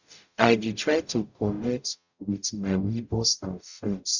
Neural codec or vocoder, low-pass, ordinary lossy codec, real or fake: codec, 44.1 kHz, 0.9 kbps, DAC; 7.2 kHz; none; fake